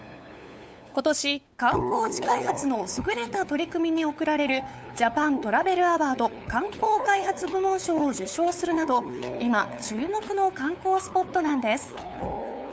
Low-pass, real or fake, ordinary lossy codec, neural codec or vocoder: none; fake; none; codec, 16 kHz, 8 kbps, FunCodec, trained on LibriTTS, 25 frames a second